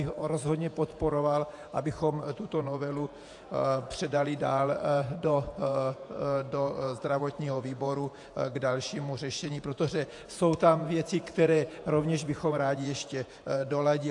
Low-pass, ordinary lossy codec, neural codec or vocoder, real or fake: 10.8 kHz; AAC, 64 kbps; vocoder, 48 kHz, 128 mel bands, Vocos; fake